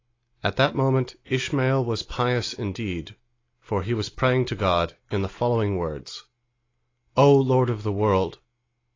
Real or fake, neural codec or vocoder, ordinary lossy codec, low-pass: real; none; AAC, 32 kbps; 7.2 kHz